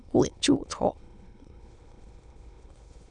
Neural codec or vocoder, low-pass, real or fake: autoencoder, 22.05 kHz, a latent of 192 numbers a frame, VITS, trained on many speakers; 9.9 kHz; fake